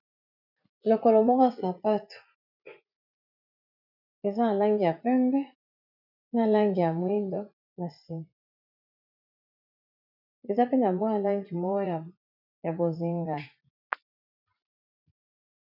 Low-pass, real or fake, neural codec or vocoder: 5.4 kHz; fake; vocoder, 44.1 kHz, 80 mel bands, Vocos